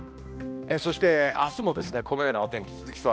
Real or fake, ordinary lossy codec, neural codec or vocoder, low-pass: fake; none; codec, 16 kHz, 1 kbps, X-Codec, HuBERT features, trained on balanced general audio; none